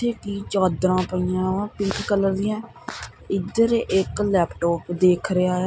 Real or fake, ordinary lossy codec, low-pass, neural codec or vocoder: real; none; none; none